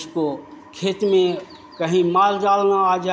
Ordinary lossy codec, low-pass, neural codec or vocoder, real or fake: none; none; none; real